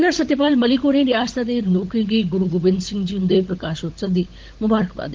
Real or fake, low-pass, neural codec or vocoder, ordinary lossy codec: fake; 7.2 kHz; codec, 16 kHz, 16 kbps, FunCodec, trained on LibriTTS, 50 frames a second; Opus, 16 kbps